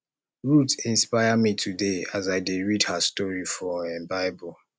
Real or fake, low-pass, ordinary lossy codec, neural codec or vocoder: real; none; none; none